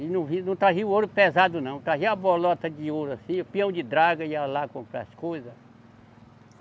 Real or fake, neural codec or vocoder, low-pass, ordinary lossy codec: real; none; none; none